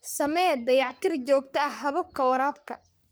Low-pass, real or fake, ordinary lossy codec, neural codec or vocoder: none; fake; none; codec, 44.1 kHz, 3.4 kbps, Pupu-Codec